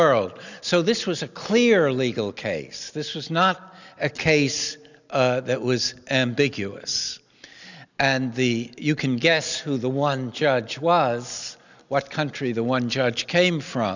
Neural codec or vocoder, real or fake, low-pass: none; real; 7.2 kHz